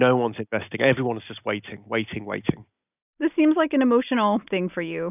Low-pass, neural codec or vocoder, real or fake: 3.6 kHz; none; real